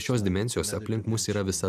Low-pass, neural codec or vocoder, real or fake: 14.4 kHz; none; real